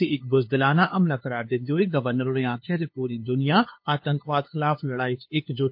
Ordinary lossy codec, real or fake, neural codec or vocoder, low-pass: MP3, 32 kbps; fake; codec, 16 kHz, 2 kbps, FunCodec, trained on LibriTTS, 25 frames a second; 5.4 kHz